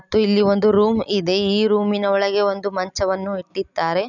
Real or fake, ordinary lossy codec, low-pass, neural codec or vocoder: real; none; 7.2 kHz; none